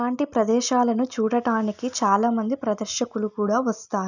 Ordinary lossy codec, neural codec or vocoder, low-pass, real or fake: none; none; 7.2 kHz; real